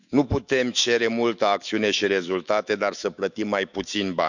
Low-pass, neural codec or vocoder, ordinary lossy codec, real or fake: 7.2 kHz; autoencoder, 48 kHz, 128 numbers a frame, DAC-VAE, trained on Japanese speech; none; fake